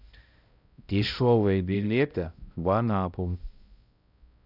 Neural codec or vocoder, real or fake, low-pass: codec, 16 kHz, 0.5 kbps, X-Codec, HuBERT features, trained on balanced general audio; fake; 5.4 kHz